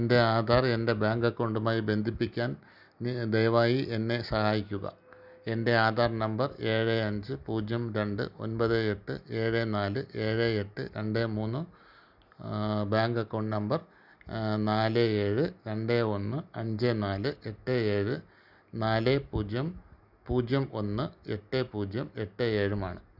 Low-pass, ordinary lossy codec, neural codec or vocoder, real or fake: 5.4 kHz; none; none; real